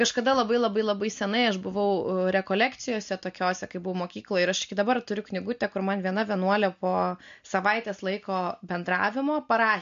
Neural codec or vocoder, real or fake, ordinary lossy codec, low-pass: none; real; MP3, 48 kbps; 7.2 kHz